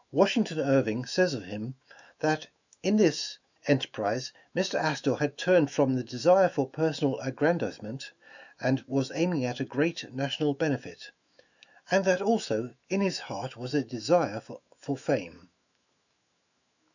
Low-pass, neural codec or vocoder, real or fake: 7.2 kHz; autoencoder, 48 kHz, 128 numbers a frame, DAC-VAE, trained on Japanese speech; fake